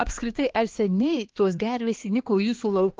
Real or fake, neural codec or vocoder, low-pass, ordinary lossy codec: fake; codec, 16 kHz, 2 kbps, X-Codec, HuBERT features, trained on balanced general audio; 7.2 kHz; Opus, 16 kbps